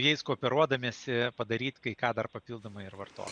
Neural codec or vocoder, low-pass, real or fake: none; 9.9 kHz; real